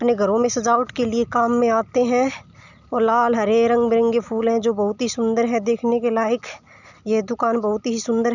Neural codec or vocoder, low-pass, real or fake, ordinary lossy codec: none; 7.2 kHz; real; none